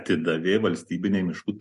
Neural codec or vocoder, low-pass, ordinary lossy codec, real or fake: none; 14.4 kHz; MP3, 48 kbps; real